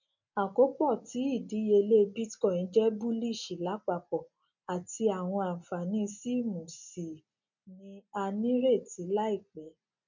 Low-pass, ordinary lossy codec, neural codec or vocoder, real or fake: 7.2 kHz; none; none; real